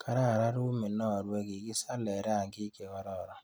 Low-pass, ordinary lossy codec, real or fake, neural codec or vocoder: none; none; real; none